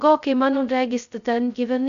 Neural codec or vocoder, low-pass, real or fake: codec, 16 kHz, 0.2 kbps, FocalCodec; 7.2 kHz; fake